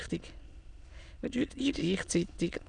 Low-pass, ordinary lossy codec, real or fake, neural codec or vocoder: 9.9 kHz; none; fake; autoencoder, 22.05 kHz, a latent of 192 numbers a frame, VITS, trained on many speakers